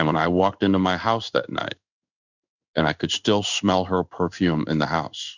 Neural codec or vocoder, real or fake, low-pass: codec, 16 kHz in and 24 kHz out, 1 kbps, XY-Tokenizer; fake; 7.2 kHz